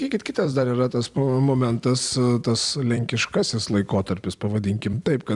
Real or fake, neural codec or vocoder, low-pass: fake; vocoder, 44.1 kHz, 128 mel bands every 256 samples, BigVGAN v2; 10.8 kHz